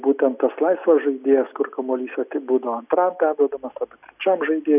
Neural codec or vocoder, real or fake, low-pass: none; real; 3.6 kHz